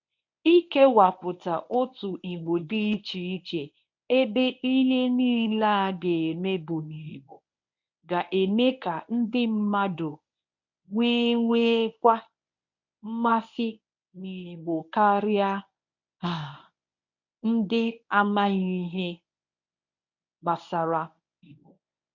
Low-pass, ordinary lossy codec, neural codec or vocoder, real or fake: 7.2 kHz; none; codec, 24 kHz, 0.9 kbps, WavTokenizer, medium speech release version 1; fake